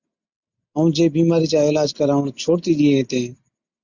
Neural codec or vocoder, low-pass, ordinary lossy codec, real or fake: none; 7.2 kHz; Opus, 64 kbps; real